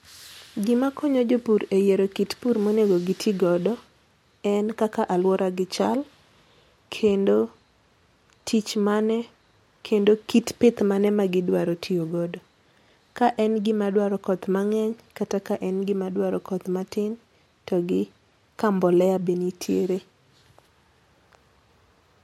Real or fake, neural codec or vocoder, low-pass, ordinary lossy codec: real; none; 19.8 kHz; MP3, 64 kbps